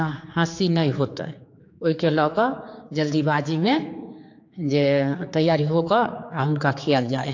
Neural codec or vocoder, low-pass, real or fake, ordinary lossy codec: codec, 16 kHz, 4 kbps, X-Codec, HuBERT features, trained on general audio; 7.2 kHz; fake; AAC, 48 kbps